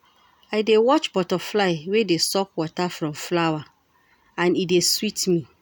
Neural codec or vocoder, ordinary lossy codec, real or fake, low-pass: none; none; real; none